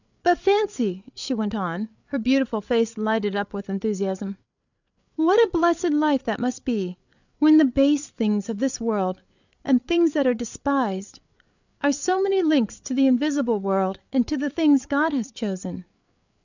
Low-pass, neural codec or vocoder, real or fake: 7.2 kHz; codec, 16 kHz, 8 kbps, FreqCodec, larger model; fake